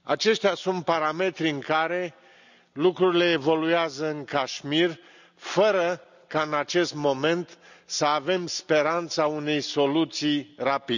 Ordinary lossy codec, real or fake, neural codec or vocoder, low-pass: none; real; none; 7.2 kHz